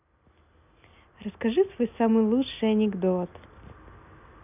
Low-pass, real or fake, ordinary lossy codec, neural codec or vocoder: 3.6 kHz; real; none; none